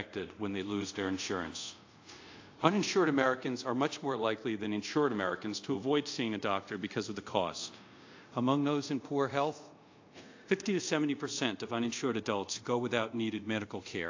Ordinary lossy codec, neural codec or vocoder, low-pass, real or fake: AAC, 48 kbps; codec, 24 kHz, 0.5 kbps, DualCodec; 7.2 kHz; fake